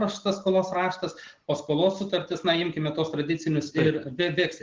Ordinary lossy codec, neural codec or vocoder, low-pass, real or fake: Opus, 16 kbps; none; 7.2 kHz; real